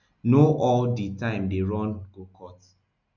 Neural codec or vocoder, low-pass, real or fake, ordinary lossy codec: none; 7.2 kHz; real; none